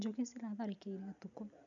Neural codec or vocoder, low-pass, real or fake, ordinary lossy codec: none; 7.2 kHz; real; none